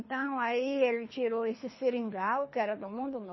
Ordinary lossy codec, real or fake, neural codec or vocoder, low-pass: MP3, 24 kbps; fake; codec, 24 kHz, 3 kbps, HILCodec; 7.2 kHz